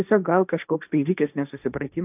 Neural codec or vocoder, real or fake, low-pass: codec, 16 kHz, 1.1 kbps, Voila-Tokenizer; fake; 3.6 kHz